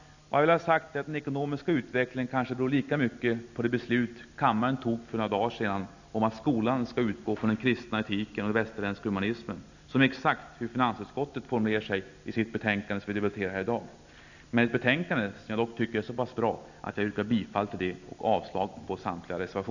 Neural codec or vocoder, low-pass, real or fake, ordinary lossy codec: none; 7.2 kHz; real; none